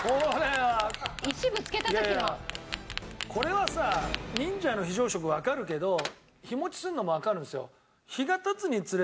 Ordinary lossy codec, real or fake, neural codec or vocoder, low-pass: none; real; none; none